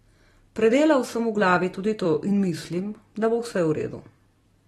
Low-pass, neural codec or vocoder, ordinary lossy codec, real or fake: 19.8 kHz; vocoder, 44.1 kHz, 128 mel bands every 256 samples, BigVGAN v2; AAC, 32 kbps; fake